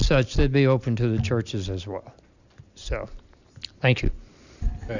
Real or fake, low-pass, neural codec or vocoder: real; 7.2 kHz; none